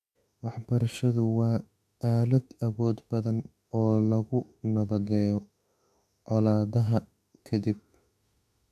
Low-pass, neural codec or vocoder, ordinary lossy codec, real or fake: 14.4 kHz; autoencoder, 48 kHz, 32 numbers a frame, DAC-VAE, trained on Japanese speech; none; fake